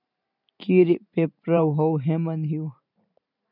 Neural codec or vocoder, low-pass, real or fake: vocoder, 44.1 kHz, 128 mel bands every 512 samples, BigVGAN v2; 5.4 kHz; fake